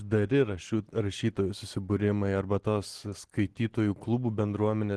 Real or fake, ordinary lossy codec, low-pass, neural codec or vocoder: real; Opus, 16 kbps; 10.8 kHz; none